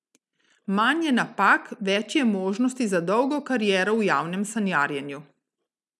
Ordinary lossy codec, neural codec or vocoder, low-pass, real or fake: none; none; none; real